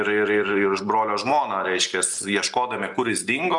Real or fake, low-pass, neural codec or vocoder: real; 10.8 kHz; none